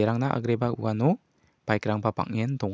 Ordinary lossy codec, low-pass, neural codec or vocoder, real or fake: none; none; none; real